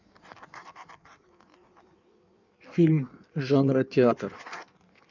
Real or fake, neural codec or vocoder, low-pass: fake; codec, 16 kHz in and 24 kHz out, 1.1 kbps, FireRedTTS-2 codec; 7.2 kHz